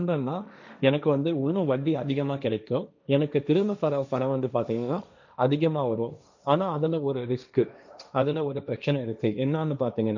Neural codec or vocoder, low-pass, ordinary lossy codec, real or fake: codec, 16 kHz, 1.1 kbps, Voila-Tokenizer; 7.2 kHz; none; fake